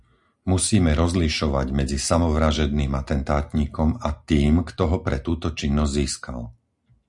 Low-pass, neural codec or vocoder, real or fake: 10.8 kHz; none; real